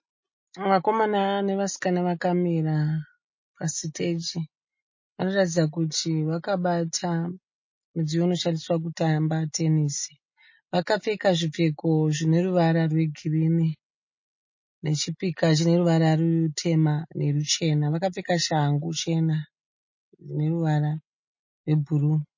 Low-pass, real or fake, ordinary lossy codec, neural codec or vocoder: 7.2 kHz; real; MP3, 32 kbps; none